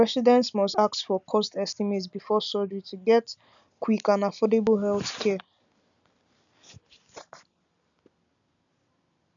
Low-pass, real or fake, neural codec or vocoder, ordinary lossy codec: 7.2 kHz; real; none; none